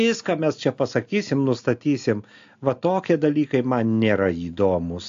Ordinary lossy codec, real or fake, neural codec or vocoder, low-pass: AAC, 64 kbps; real; none; 7.2 kHz